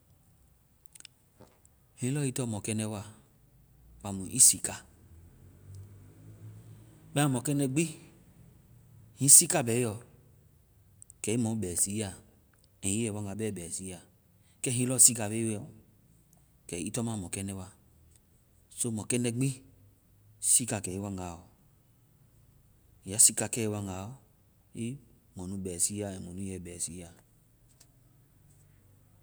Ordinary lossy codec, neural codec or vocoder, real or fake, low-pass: none; none; real; none